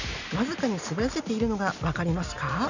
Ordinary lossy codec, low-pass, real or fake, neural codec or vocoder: none; 7.2 kHz; real; none